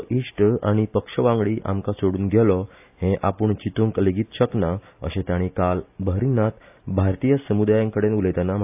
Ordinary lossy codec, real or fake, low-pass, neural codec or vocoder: AAC, 32 kbps; real; 3.6 kHz; none